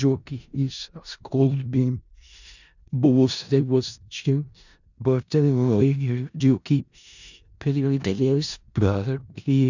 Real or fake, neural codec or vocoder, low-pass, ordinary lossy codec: fake; codec, 16 kHz in and 24 kHz out, 0.4 kbps, LongCat-Audio-Codec, four codebook decoder; 7.2 kHz; none